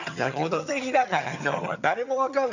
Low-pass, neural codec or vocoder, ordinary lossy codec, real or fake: 7.2 kHz; vocoder, 22.05 kHz, 80 mel bands, HiFi-GAN; none; fake